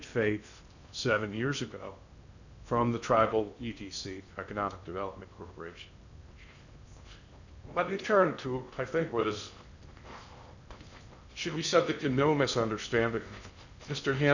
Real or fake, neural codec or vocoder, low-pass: fake; codec, 16 kHz in and 24 kHz out, 0.6 kbps, FocalCodec, streaming, 2048 codes; 7.2 kHz